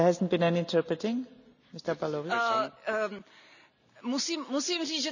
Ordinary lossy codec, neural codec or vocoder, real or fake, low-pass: none; none; real; 7.2 kHz